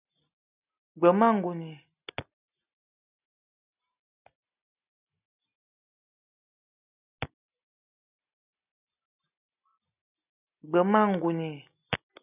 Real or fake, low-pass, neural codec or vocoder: real; 3.6 kHz; none